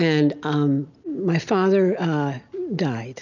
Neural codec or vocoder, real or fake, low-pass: none; real; 7.2 kHz